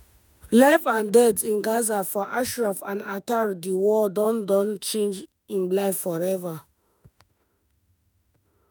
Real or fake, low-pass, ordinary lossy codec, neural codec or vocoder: fake; none; none; autoencoder, 48 kHz, 32 numbers a frame, DAC-VAE, trained on Japanese speech